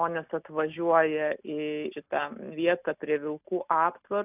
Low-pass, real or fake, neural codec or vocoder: 3.6 kHz; real; none